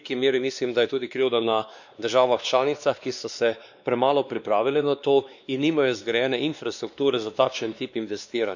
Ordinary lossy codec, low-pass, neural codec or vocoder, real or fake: none; 7.2 kHz; codec, 16 kHz, 2 kbps, X-Codec, WavLM features, trained on Multilingual LibriSpeech; fake